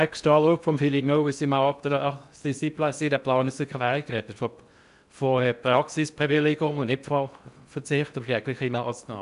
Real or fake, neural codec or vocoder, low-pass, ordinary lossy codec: fake; codec, 16 kHz in and 24 kHz out, 0.6 kbps, FocalCodec, streaming, 2048 codes; 10.8 kHz; none